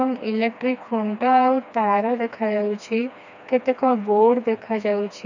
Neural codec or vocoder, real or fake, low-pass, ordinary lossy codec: codec, 16 kHz, 2 kbps, FreqCodec, smaller model; fake; 7.2 kHz; none